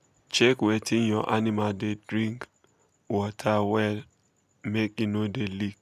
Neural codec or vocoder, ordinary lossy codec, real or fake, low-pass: none; none; real; 14.4 kHz